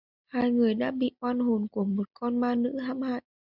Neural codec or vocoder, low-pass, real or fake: none; 5.4 kHz; real